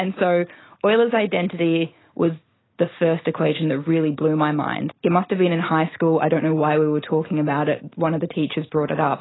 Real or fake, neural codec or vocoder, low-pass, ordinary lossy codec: real; none; 7.2 kHz; AAC, 16 kbps